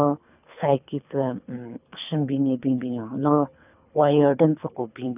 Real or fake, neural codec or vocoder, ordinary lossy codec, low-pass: fake; codec, 24 kHz, 3 kbps, HILCodec; none; 3.6 kHz